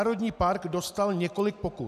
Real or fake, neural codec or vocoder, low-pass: real; none; 14.4 kHz